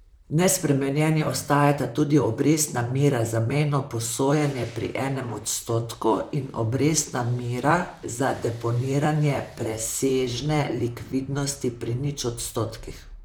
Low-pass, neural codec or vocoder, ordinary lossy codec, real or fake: none; vocoder, 44.1 kHz, 128 mel bands, Pupu-Vocoder; none; fake